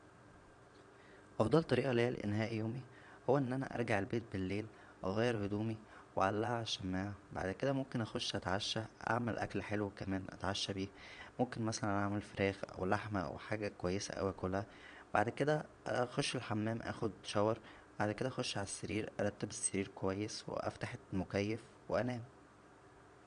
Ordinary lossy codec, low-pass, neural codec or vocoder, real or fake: none; 9.9 kHz; vocoder, 22.05 kHz, 80 mel bands, Vocos; fake